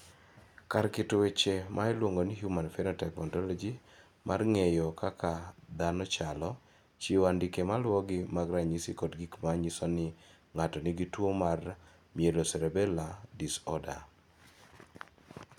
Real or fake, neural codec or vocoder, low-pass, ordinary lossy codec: real; none; 19.8 kHz; none